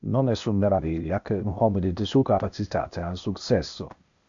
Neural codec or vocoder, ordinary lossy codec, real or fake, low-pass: codec, 16 kHz, 0.8 kbps, ZipCodec; MP3, 64 kbps; fake; 7.2 kHz